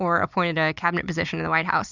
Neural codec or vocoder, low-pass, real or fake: none; 7.2 kHz; real